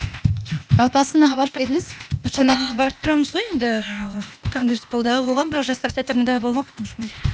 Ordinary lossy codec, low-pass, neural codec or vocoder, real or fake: none; none; codec, 16 kHz, 0.8 kbps, ZipCodec; fake